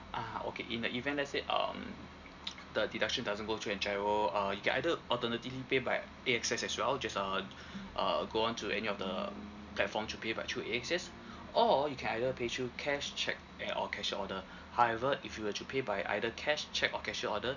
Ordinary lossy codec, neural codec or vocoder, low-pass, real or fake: none; none; 7.2 kHz; real